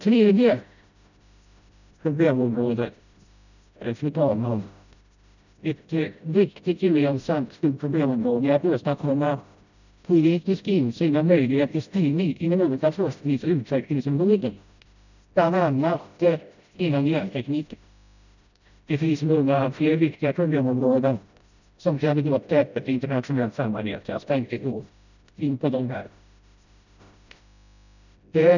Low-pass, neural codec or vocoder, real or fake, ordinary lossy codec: 7.2 kHz; codec, 16 kHz, 0.5 kbps, FreqCodec, smaller model; fake; none